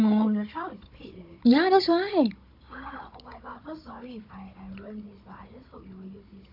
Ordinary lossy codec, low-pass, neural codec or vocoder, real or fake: AAC, 48 kbps; 5.4 kHz; codec, 16 kHz, 16 kbps, FunCodec, trained on Chinese and English, 50 frames a second; fake